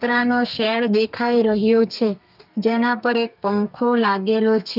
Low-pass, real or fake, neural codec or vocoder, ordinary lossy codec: 5.4 kHz; fake; codec, 32 kHz, 1.9 kbps, SNAC; none